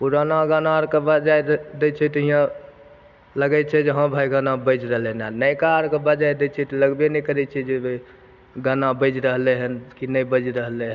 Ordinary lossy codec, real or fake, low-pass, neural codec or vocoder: none; real; 7.2 kHz; none